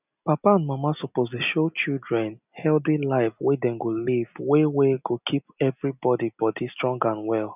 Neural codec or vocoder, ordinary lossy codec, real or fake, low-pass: none; none; real; 3.6 kHz